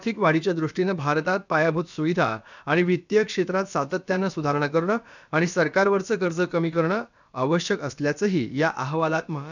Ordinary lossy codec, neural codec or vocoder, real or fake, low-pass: none; codec, 16 kHz, about 1 kbps, DyCAST, with the encoder's durations; fake; 7.2 kHz